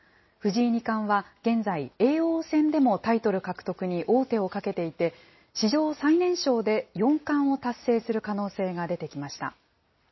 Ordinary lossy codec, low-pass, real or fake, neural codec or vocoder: MP3, 24 kbps; 7.2 kHz; real; none